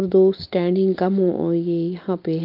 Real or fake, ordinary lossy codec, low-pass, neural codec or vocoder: real; Opus, 24 kbps; 5.4 kHz; none